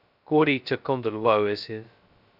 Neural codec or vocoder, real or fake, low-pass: codec, 16 kHz, 0.2 kbps, FocalCodec; fake; 5.4 kHz